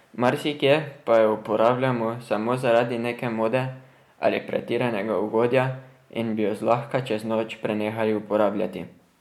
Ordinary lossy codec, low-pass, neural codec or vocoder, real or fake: MP3, 96 kbps; 19.8 kHz; none; real